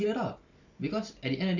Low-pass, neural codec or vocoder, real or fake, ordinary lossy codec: 7.2 kHz; none; real; none